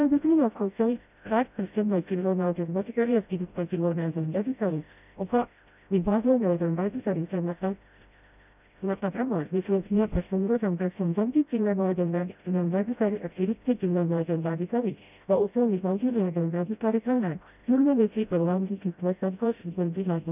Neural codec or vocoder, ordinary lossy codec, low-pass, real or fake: codec, 16 kHz, 0.5 kbps, FreqCodec, smaller model; none; 3.6 kHz; fake